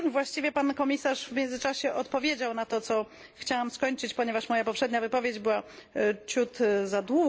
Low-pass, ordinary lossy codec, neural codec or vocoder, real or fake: none; none; none; real